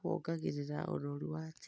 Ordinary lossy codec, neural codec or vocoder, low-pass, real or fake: none; none; none; real